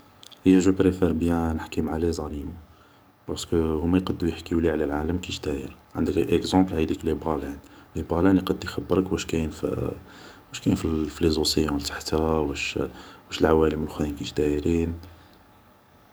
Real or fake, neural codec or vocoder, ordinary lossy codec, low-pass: fake; codec, 44.1 kHz, 7.8 kbps, DAC; none; none